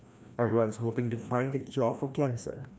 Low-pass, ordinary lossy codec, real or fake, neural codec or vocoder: none; none; fake; codec, 16 kHz, 1 kbps, FreqCodec, larger model